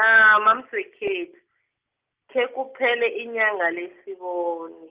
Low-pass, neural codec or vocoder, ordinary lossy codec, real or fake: 3.6 kHz; none; Opus, 24 kbps; real